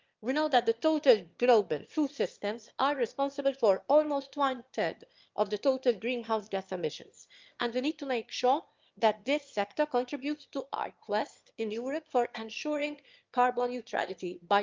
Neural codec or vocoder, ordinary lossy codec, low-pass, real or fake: autoencoder, 22.05 kHz, a latent of 192 numbers a frame, VITS, trained on one speaker; Opus, 32 kbps; 7.2 kHz; fake